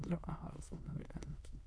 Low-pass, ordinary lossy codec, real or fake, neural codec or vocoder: none; none; fake; autoencoder, 22.05 kHz, a latent of 192 numbers a frame, VITS, trained on many speakers